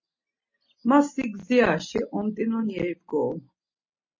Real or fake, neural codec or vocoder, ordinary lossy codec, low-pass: real; none; MP3, 32 kbps; 7.2 kHz